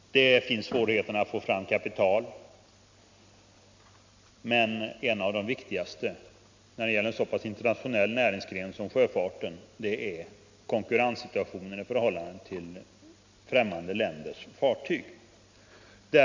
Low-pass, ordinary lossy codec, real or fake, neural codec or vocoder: 7.2 kHz; MP3, 64 kbps; real; none